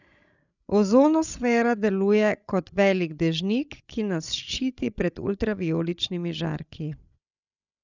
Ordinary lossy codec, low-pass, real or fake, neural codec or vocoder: none; 7.2 kHz; fake; codec, 16 kHz, 16 kbps, FreqCodec, larger model